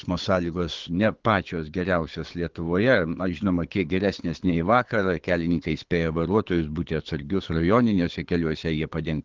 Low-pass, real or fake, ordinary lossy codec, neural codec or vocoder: 7.2 kHz; fake; Opus, 16 kbps; codec, 24 kHz, 6 kbps, HILCodec